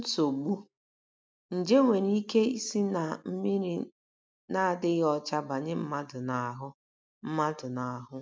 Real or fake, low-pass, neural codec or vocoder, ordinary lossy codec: real; none; none; none